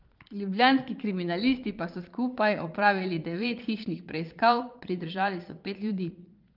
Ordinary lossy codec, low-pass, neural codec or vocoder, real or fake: Opus, 24 kbps; 5.4 kHz; vocoder, 22.05 kHz, 80 mel bands, Vocos; fake